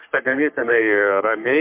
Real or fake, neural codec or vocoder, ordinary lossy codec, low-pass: fake; codec, 44.1 kHz, 3.4 kbps, Pupu-Codec; MP3, 32 kbps; 3.6 kHz